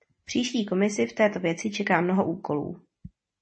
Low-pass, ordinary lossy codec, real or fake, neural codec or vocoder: 9.9 kHz; MP3, 32 kbps; real; none